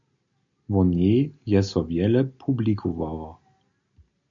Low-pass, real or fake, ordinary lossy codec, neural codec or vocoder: 7.2 kHz; real; MP3, 64 kbps; none